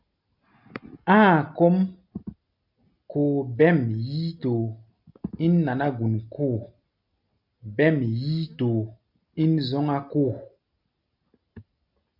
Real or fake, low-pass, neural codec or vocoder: real; 5.4 kHz; none